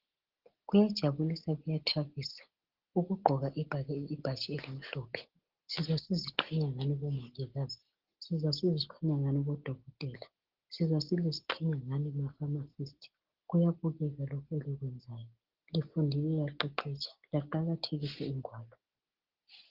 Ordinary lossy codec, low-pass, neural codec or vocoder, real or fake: Opus, 16 kbps; 5.4 kHz; none; real